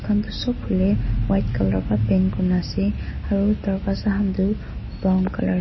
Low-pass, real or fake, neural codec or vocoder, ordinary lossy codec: 7.2 kHz; real; none; MP3, 24 kbps